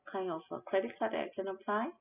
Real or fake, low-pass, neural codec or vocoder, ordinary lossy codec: real; 3.6 kHz; none; none